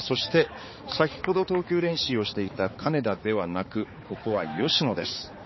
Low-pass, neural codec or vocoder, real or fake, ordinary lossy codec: 7.2 kHz; codec, 16 kHz, 4 kbps, X-Codec, HuBERT features, trained on balanced general audio; fake; MP3, 24 kbps